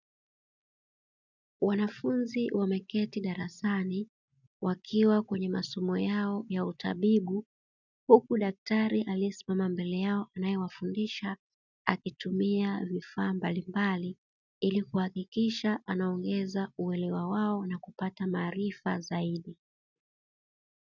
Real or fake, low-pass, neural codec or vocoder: real; 7.2 kHz; none